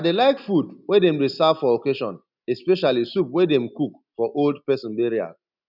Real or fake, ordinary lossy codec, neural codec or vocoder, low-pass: real; none; none; 5.4 kHz